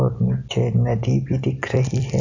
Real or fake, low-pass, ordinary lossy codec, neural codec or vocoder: real; 7.2 kHz; none; none